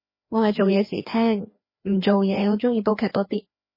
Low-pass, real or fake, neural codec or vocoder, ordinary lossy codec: 5.4 kHz; fake; codec, 16 kHz, 2 kbps, FreqCodec, larger model; MP3, 24 kbps